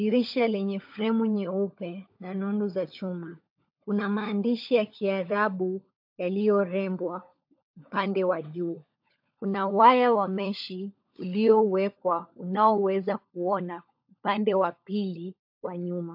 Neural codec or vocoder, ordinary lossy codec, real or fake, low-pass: codec, 16 kHz, 16 kbps, FunCodec, trained on LibriTTS, 50 frames a second; AAC, 48 kbps; fake; 5.4 kHz